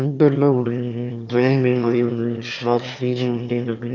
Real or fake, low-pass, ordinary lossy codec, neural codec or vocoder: fake; 7.2 kHz; none; autoencoder, 22.05 kHz, a latent of 192 numbers a frame, VITS, trained on one speaker